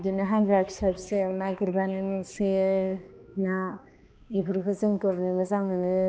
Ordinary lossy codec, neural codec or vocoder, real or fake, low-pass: none; codec, 16 kHz, 2 kbps, X-Codec, HuBERT features, trained on balanced general audio; fake; none